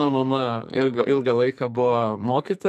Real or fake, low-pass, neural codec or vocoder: fake; 14.4 kHz; codec, 44.1 kHz, 2.6 kbps, SNAC